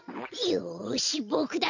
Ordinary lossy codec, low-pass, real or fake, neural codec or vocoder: none; 7.2 kHz; fake; vocoder, 22.05 kHz, 80 mel bands, WaveNeXt